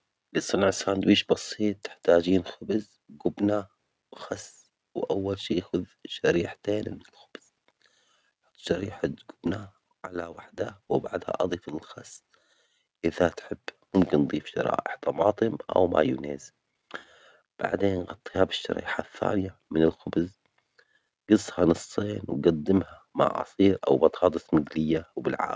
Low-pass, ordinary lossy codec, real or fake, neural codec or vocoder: none; none; real; none